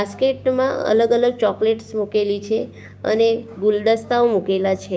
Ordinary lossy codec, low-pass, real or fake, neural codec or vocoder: none; none; fake; codec, 16 kHz, 6 kbps, DAC